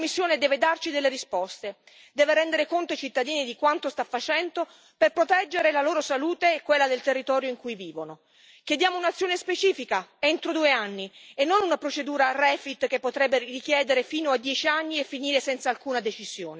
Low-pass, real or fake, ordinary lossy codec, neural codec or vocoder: none; real; none; none